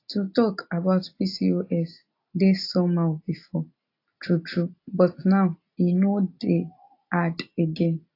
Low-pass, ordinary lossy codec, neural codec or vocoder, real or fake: 5.4 kHz; AAC, 32 kbps; none; real